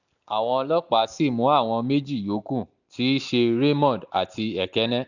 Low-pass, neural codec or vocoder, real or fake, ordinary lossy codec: 7.2 kHz; none; real; none